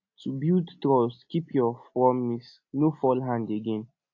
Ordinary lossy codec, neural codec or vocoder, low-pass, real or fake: AAC, 48 kbps; none; 7.2 kHz; real